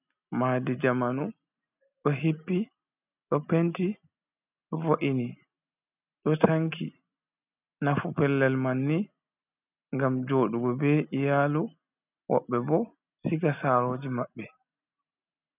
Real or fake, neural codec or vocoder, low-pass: real; none; 3.6 kHz